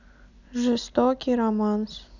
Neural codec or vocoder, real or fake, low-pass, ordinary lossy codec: none; real; 7.2 kHz; none